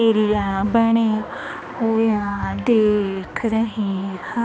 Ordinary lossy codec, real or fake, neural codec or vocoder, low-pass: none; fake; codec, 16 kHz, 2 kbps, X-Codec, HuBERT features, trained on balanced general audio; none